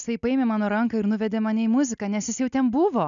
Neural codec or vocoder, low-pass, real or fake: none; 7.2 kHz; real